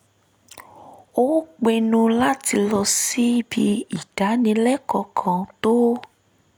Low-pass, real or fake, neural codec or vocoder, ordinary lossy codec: none; real; none; none